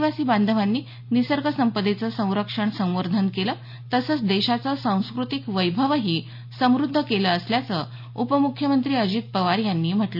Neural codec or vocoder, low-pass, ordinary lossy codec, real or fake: none; 5.4 kHz; MP3, 48 kbps; real